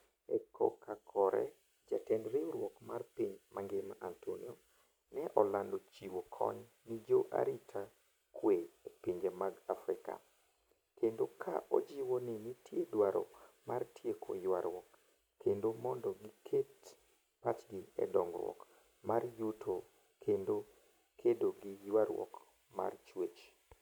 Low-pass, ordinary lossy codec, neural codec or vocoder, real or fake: none; none; none; real